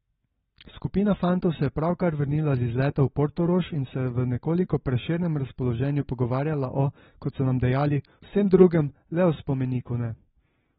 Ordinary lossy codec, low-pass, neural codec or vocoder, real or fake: AAC, 16 kbps; 7.2 kHz; none; real